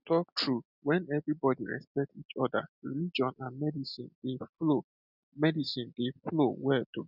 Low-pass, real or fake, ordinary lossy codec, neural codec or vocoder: 5.4 kHz; real; none; none